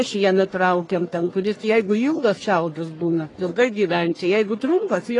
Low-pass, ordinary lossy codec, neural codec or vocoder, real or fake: 10.8 kHz; AAC, 32 kbps; codec, 44.1 kHz, 1.7 kbps, Pupu-Codec; fake